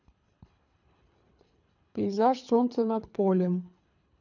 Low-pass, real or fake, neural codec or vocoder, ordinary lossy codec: 7.2 kHz; fake; codec, 24 kHz, 3 kbps, HILCodec; none